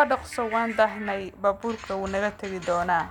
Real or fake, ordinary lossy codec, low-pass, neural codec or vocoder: real; none; 19.8 kHz; none